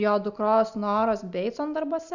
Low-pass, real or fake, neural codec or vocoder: 7.2 kHz; real; none